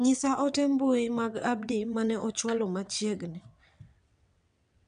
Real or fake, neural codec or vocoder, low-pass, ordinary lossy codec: fake; vocoder, 22.05 kHz, 80 mel bands, WaveNeXt; 9.9 kHz; none